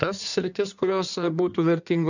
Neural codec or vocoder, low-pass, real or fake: codec, 16 kHz in and 24 kHz out, 1.1 kbps, FireRedTTS-2 codec; 7.2 kHz; fake